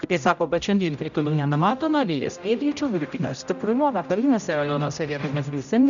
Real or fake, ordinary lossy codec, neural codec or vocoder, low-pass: fake; MP3, 96 kbps; codec, 16 kHz, 0.5 kbps, X-Codec, HuBERT features, trained on general audio; 7.2 kHz